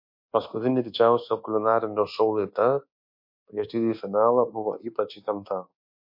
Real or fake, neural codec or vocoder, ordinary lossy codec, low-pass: fake; codec, 24 kHz, 1.2 kbps, DualCodec; MP3, 32 kbps; 5.4 kHz